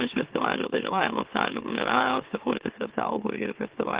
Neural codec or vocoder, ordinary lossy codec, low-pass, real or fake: autoencoder, 44.1 kHz, a latent of 192 numbers a frame, MeloTTS; Opus, 16 kbps; 3.6 kHz; fake